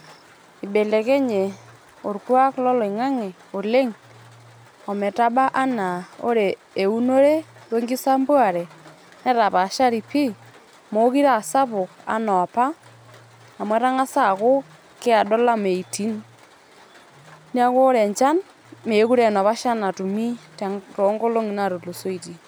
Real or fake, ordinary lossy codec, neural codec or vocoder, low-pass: real; none; none; none